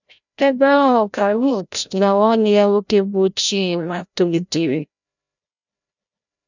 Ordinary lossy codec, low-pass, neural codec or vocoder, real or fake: none; 7.2 kHz; codec, 16 kHz, 0.5 kbps, FreqCodec, larger model; fake